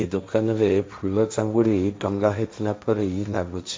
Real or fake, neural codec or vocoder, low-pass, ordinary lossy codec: fake; codec, 16 kHz, 1.1 kbps, Voila-Tokenizer; none; none